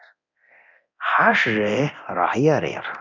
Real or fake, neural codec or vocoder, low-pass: fake; codec, 24 kHz, 0.9 kbps, DualCodec; 7.2 kHz